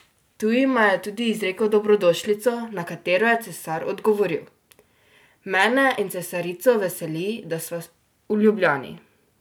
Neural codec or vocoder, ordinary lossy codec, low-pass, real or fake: none; none; none; real